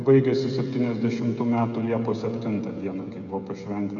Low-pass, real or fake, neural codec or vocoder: 7.2 kHz; fake; codec, 16 kHz, 16 kbps, FreqCodec, smaller model